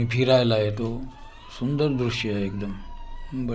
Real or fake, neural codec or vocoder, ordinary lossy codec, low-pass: real; none; none; none